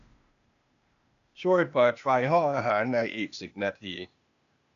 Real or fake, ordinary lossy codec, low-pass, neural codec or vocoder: fake; none; 7.2 kHz; codec, 16 kHz, 0.8 kbps, ZipCodec